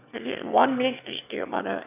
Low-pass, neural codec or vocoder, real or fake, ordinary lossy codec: 3.6 kHz; autoencoder, 22.05 kHz, a latent of 192 numbers a frame, VITS, trained on one speaker; fake; AAC, 32 kbps